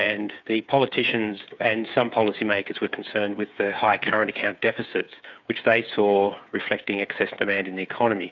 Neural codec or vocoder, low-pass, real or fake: codec, 16 kHz, 8 kbps, FreqCodec, smaller model; 7.2 kHz; fake